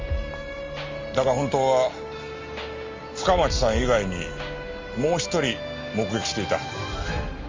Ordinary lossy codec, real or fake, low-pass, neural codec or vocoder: Opus, 32 kbps; real; 7.2 kHz; none